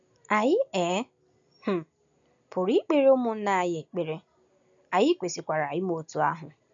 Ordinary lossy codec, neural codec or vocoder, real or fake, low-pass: none; none; real; 7.2 kHz